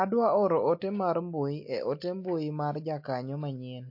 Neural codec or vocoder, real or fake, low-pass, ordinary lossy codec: none; real; 5.4 kHz; MP3, 32 kbps